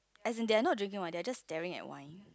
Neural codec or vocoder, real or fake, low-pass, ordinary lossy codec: none; real; none; none